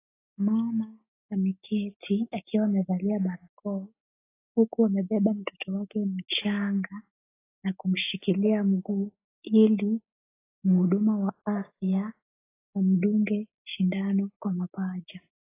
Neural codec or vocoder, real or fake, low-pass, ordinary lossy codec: none; real; 3.6 kHz; AAC, 24 kbps